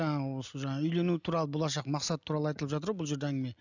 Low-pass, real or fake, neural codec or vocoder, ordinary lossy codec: 7.2 kHz; real; none; none